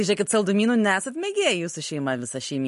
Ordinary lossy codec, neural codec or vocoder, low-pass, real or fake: MP3, 48 kbps; none; 14.4 kHz; real